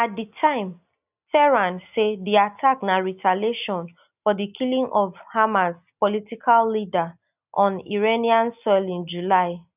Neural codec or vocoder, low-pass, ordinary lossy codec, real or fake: none; 3.6 kHz; none; real